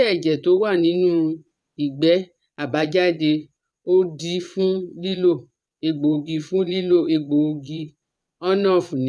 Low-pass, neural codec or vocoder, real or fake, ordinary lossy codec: none; vocoder, 22.05 kHz, 80 mel bands, Vocos; fake; none